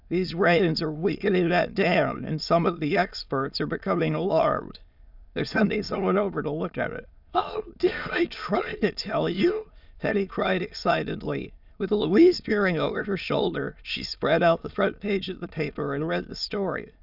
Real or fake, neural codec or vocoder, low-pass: fake; autoencoder, 22.05 kHz, a latent of 192 numbers a frame, VITS, trained on many speakers; 5.4 kHz